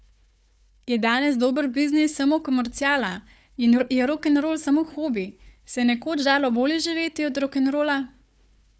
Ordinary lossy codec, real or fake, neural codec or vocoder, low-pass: none; fake; codec, 16 kHz, 4 kbps, FunCodec, trained on Chinese and English, 50 frames a second; none